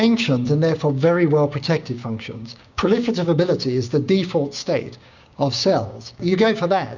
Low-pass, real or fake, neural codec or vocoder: 7.2 kHz; fake; codec, 16 kHz, 6 kbps, DAC